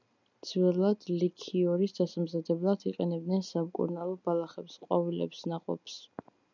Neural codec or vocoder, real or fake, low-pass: none; real; 7.2 kHz